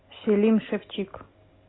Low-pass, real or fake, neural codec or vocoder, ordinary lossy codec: 7.2 kHz; real; none; AAC, 16 kbps